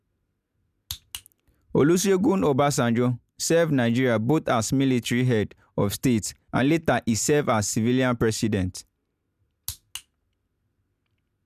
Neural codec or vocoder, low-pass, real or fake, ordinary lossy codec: none; 14.4 kHz; real; none